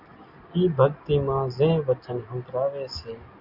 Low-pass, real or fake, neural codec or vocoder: 5.4 kHz; real; none